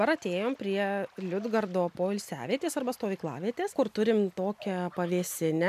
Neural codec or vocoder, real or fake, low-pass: none; real; 14.4 kHz